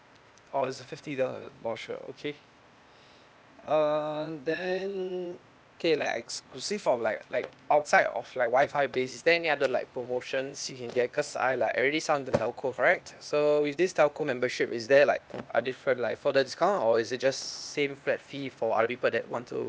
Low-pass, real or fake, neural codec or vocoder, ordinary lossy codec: none; fake; codec, 16 kHz, 0.8 kbps, ZipCodec; none